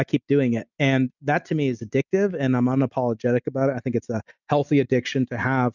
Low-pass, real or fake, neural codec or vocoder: 7.2 kHz; real; none